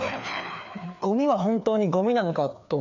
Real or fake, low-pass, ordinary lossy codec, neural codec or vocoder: fake; 7.2 kHz; none; codec, 16 kHz, 2 kbps, FreqCodec, larger model